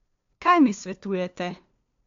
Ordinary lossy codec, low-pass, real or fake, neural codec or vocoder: MP3, 64 kbps; 7.2 kHz; fake; codec, 16 kHz, 2 kbps, FunCodec, trained on LibriTTS, 25 frames a second